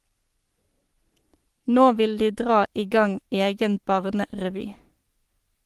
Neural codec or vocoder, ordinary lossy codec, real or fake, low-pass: codec, 44.1 kHz, 3.4 kbps, Pupu-Codec; Opus, 24 kbps; fake; 14.4 kHz